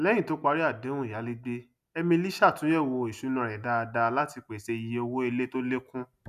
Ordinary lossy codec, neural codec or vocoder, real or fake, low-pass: none; none; real; 14.4 kHz